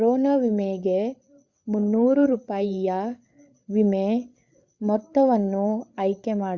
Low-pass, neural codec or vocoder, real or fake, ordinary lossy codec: 7.2 kHz; codec, 16 kHz, 8 kbps, FreqCodec, larger model; fake; Opus, 64 kbps